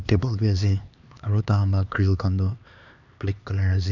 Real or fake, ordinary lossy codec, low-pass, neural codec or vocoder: fake; none; 7.2 kHz; codec, 16 kHz, 2 kbps, X-Codec, HuBERT features, trained on LibriSpeech